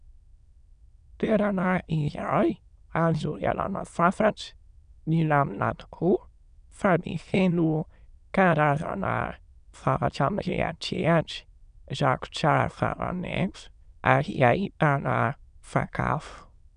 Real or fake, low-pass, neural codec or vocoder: fake; 9.9 kHz; autoencoder, 22.05 kHz, a latent of 192 numbers a frame, VITS, trained on many speakers